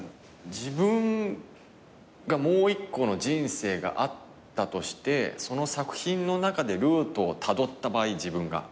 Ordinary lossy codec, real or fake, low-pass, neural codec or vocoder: none; real; none; none